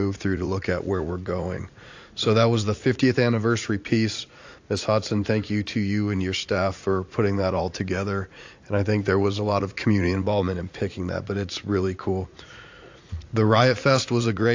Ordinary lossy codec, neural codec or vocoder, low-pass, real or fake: AAC, 48 kbps; vocoder, 44.1 kHz, 80 mel bands, Vocos; 7.2 kHz; fake